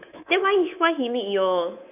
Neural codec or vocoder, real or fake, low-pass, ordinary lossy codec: codec, 24 kHz, 3.1 kbps, DualCodec; fake; 3.6 kHz; none